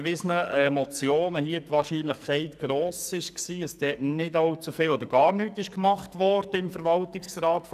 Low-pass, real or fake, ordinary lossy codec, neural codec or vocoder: 14.4 kHz; fake; none; codec, 44.1 kHz, 2.6 kbps, SNAC